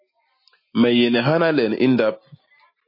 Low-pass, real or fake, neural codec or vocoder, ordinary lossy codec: 5.4 kHz; real; none; MP3, 24 kbps